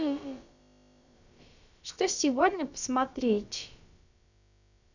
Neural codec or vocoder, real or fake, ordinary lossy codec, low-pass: codec, 16 kHz, about 1 kbps, DyCAST, with the encoder's durations; fake; none; 7.2 kHz